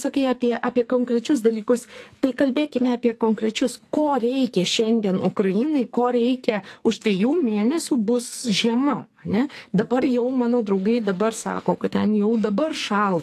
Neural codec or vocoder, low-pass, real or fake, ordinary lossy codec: codec, 44.1 kHz, 2.6 kbps, SNAC; 14.4 kHz; fake; AAC, 64 kbps